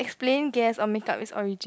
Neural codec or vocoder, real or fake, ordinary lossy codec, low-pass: none; real; none; none